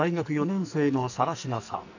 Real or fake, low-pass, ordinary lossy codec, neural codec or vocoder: fake; 7.2 kHz; none; codec, 44.1 kHz, 2.6 kbps, SNAC